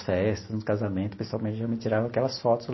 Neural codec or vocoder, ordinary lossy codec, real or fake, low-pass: none; MP3, 24 kbps; real; 7.2 kHz